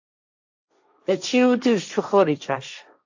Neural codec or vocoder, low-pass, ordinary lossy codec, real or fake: codec, 16 kHz, 1.1 kbps, Voila-Tokenizer; 7.2 kHz; AAC, 48 kbps; fake